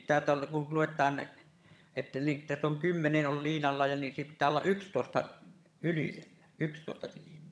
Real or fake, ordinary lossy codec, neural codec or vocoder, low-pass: fake; none; vocoder, 22.05 kHz, 80 mel bands, HiFi-GAN; none